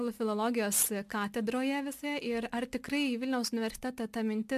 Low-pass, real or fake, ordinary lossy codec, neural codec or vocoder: 14.4 kHz; real; AAC, 96 kbps; none